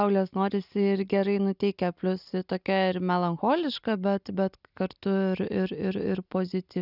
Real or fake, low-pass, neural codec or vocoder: real; 5.4 kHz; none